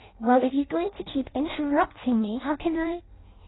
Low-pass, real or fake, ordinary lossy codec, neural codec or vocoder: 7.2 kHz; fake; AAC, 16 kbps; codec, 16 kHz in and 24 kHz out, 0.6 kbps, FireRedTTS-2 codec